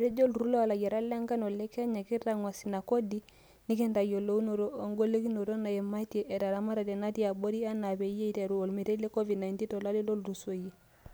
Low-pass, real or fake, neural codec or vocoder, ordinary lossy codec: none; real; none; none